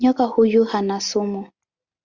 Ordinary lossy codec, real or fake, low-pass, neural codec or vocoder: Opus, 64 kbps; real; 7.2 kHz; none